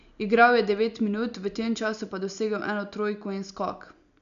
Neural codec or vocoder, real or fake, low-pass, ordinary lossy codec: none; real; 7.2 kHz; none